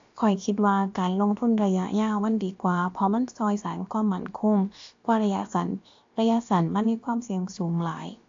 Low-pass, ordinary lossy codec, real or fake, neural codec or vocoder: 7.2 kHz; AAC, 48 kbps; fake; codec, 16 kHz, about 1 kbps, DyCAST, with the encoder's durations